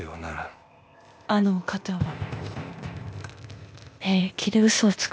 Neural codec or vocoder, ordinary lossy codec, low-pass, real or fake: codec, 16 kHz, 0.8 kbps, ZipCodec; none; none; fake